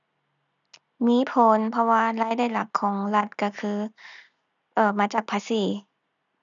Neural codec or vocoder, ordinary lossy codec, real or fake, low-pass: none; none; real; 7.2 kHz